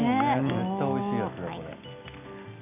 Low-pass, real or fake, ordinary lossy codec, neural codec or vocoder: 3.6 kHz; real; none; none